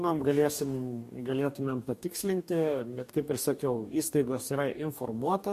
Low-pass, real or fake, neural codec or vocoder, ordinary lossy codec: 14.4 kHz; fake; codec, 44.1 kHz, 2.6 kbps, DAC; MP3, 64 kbps